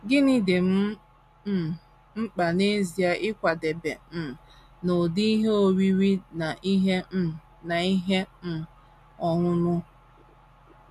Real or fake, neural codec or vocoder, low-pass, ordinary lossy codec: real; none; 14.4 kHz; MP3, 64 kbps